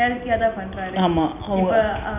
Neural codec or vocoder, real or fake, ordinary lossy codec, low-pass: none; real; none; 3.6 kHz